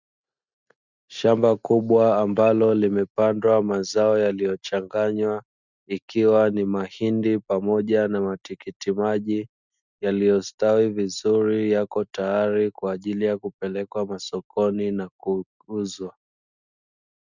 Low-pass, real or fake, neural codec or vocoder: 7.2 kHz; real; none